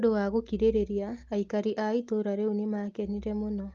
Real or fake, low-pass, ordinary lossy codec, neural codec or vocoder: real; 7.2 kHz; Opus, 32 kbps; none